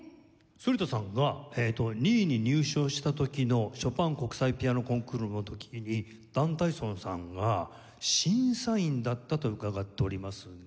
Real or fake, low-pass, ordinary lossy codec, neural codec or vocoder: real; none; none; none